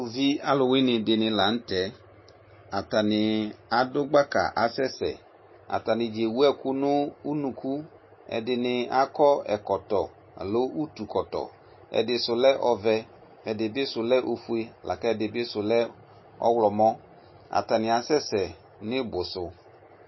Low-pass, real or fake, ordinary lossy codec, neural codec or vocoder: 7.2 kHz; real; MP3, 24 kbps; none